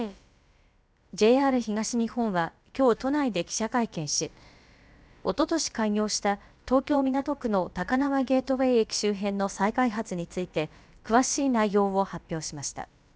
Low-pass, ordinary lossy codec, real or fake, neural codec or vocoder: none; none; fake; codec, 16 kHz, about 1 kbps, DyCAST, with the encoder's durations